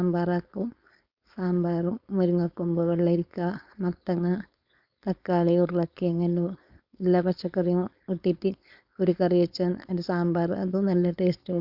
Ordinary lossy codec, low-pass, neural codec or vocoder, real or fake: Opus, 64 kbps; 5.4 kHz; codec, 16 kHz, 4.8 kbps, FACodec; fake